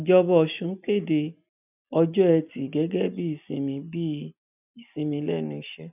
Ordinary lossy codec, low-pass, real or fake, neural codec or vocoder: none; 3.6 kHz; real; none